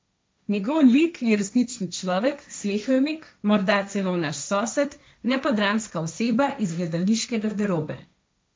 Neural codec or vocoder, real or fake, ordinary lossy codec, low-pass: codec, 16 kHz, 1.1 kbps, Voila-Tokenizer; fake; none; none